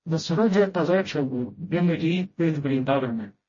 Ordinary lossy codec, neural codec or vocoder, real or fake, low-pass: MP3, 32 kbps; codec, 16 kHz, 0.5 kbps, FreqCodec, smaller model; fake; 7.2 kHz